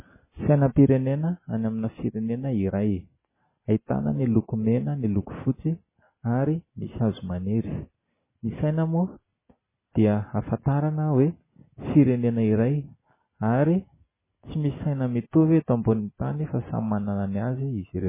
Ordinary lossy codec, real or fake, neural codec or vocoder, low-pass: MP3, 16 kbps; real; none; 3.6 kHz